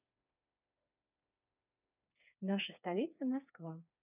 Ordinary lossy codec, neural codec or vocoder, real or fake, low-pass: none; codec, 16 kHz, 4 kbps, FreqCodec, smaller model; fake; 3.6 kHz